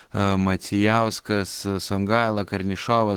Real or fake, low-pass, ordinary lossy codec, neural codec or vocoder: fake; 19.8 kHz; Opus, 16 kbps; autoencoder, 48 kHz, 32 numbers a frame, DAC-VAE, trained on Japanese speech